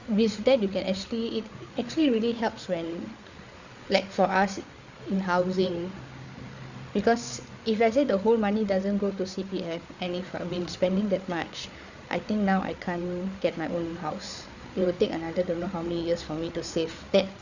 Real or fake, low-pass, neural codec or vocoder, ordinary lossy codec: fake; 7.2 kHz; codec, 16 kHz, 8 kbps, FreqCodec, larger model; Opus, 64 kbps